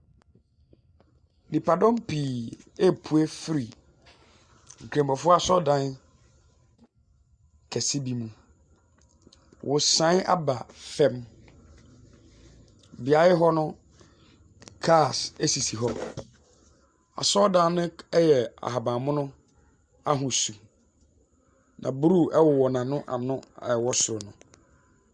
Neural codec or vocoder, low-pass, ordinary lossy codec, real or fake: none; 9.9 kHz; Opus, 64 kbps; real